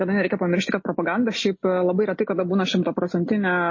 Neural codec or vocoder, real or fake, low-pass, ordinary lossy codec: none; real; 7.2 kHz; MP3, 32 kbps